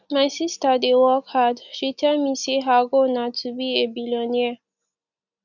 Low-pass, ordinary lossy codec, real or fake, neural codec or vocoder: 7.2 kHz; none; real; none